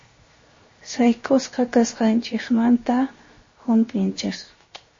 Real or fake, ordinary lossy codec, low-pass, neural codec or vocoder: fake; MP3, 32 kbps; 7.2 kHz; codec, 16 kHz, 0.7 kbps, FocalCodec